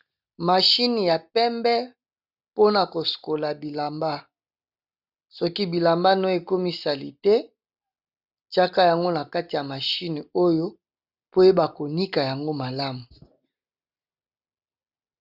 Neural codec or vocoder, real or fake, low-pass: none; real; 5.4 kHz